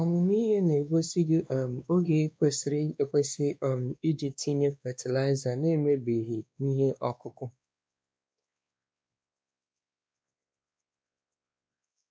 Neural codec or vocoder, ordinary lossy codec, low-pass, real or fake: codec, 16 kHz, 2 kbps, X-Codec, WavLM features, trained on Multilingual LibriSpeech; none; none; fake